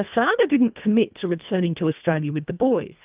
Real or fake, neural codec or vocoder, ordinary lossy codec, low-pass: fake; codec, 24 kHz, 1.5 kbps, HILCodec; Opus, 24 kbps; 3.6 kHz